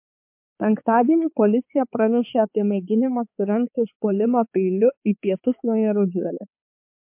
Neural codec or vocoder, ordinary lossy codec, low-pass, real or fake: codec, 16 kHz, 4 kbps, X-Codec, HuBERT features, trained on balanced general audio; MP3, 32 kbps; 3.6 kHz; fake